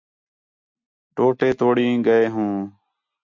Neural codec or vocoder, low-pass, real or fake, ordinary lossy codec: none; 7.2 kHz; real; MP3, 64 kbps